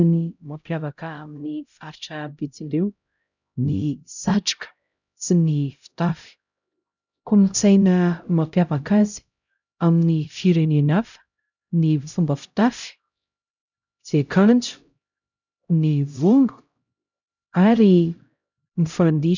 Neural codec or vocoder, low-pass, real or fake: codec, 16 kHz, 0.5 kbps, X-Codec, HuBERT features, trained on LibriSpeech; 7.2 kHz; fake